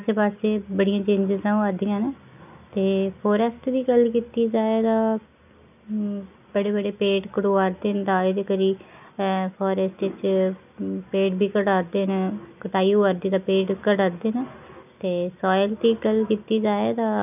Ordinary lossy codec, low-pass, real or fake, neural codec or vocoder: none; 3.6 kHz; real; none